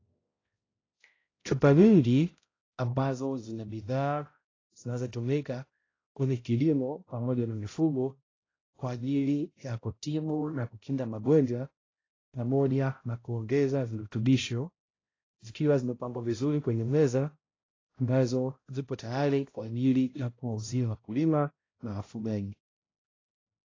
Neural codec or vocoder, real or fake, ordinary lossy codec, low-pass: codec, 16 kHz, 0.5 kbps, X-Codec, HuBERT features, trained on balanced general audio; fake; AAC, 32 kbps; 7.2 kHz